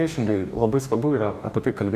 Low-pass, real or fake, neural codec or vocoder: 14.4 kHz; fake; codec, 44.1 kHz, 2.6 kbps, DAC